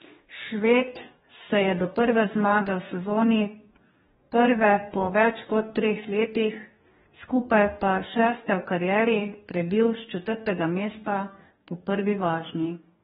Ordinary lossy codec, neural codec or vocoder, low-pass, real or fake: AAC, 16 kbps; codec, 44.1 kHz, 2.6 kbps, DAC; 19.8 kHz; fake